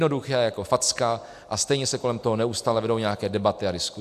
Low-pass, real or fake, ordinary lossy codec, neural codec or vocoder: 14.4 kHz; real; AAC, 96 kbps; none